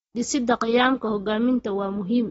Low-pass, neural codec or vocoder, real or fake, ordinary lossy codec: 9.9 kHz; none; real; AAC, 24 kbps